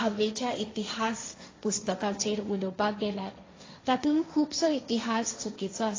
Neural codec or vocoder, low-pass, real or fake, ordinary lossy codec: codec, 16 kHz, 1.1 kbps, Voila-Tokenizer; 7.2 kHz; fake; AAC, 32 kbps